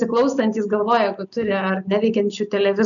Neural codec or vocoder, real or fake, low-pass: none; real; 7.2 kHz